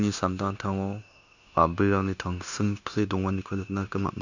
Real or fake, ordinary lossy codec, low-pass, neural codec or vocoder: fake; none; 7.2 kHz; codec, 16 kHz, 0.9 kbps, LongCat-Audio-Codec